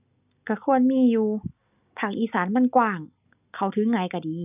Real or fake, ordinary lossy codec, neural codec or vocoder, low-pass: real; none; none; 3.6 kHz